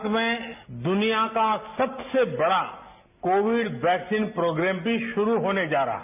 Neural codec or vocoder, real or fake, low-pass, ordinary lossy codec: none; real; 3.6 kHz; none